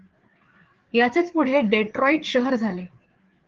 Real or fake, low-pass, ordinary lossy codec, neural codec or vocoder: fake; 7.2 kHz; Opus, 16 kbps; codec, 16 kHz, 4 kbps, FreqCodec, larger model